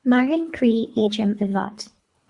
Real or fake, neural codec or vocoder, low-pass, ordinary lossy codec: fake; codec, 24 kHz, 3 kbps, HILCodec; 10.8 kHz; Opus, 64 kbps